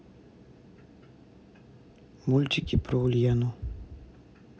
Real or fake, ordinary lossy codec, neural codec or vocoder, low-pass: real; none; none; none